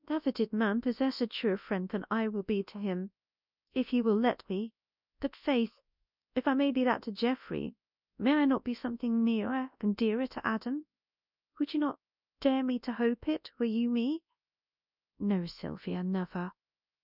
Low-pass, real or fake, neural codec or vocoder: 5.4 kHz; fake; codec, 24 kHz, 0.9 kbps, WavTokenizer, large speech release